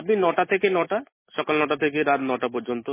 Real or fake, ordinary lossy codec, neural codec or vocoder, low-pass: real; MP3, 16 kbps; none; 3.6 kHz